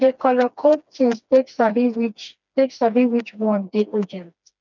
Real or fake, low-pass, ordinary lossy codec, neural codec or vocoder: fake; 7.2 kHz; none; codec, 16 kHz, 2 kbps, FreqCodec, smaller model